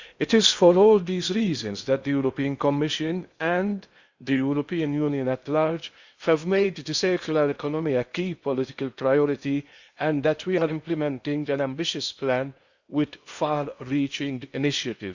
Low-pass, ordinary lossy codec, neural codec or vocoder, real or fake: 7.2 kHz; Opus, 64 kbps; codec, 16 kHz in and 24 kHz out, 0.8 kbps, FocalCodec, streaming, 65536 codes; fake